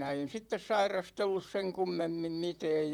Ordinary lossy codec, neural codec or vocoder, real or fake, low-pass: none; vocoder, 44.1 kHz, 128 mel bands, Pupu-Vocoder; fake; 19.8 kHz